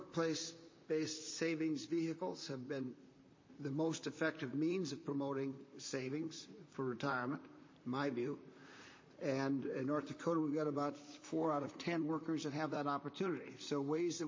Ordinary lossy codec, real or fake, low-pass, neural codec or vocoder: MP3, 32 kbps; fake; 7.2 kHz; vocoder, 44.1 kHz, 128 mel bands, Pupu-Vocoder